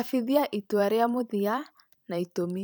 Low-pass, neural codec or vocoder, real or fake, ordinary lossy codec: none; vocoder, 44.1 kHz, 128 mel bands every 512 samples, BigVGAN v2; fake; none